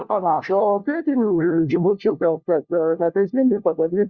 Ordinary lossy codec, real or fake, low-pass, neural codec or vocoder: Opus, 64 kbps; fake; 7.2 kHz; codec, 16 kHz, 1 kbps, FunCodec, trained on LibriTTS, 50 frames a second